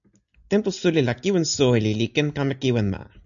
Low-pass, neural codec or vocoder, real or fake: 7.2 kHz; none; real